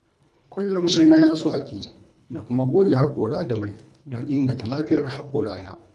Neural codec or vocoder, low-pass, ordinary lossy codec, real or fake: codec, 24 kHz, 1.5 kbps, HILCodec; none; none; fake